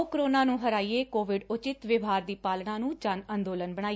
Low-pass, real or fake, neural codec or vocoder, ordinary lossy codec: none; real; none; none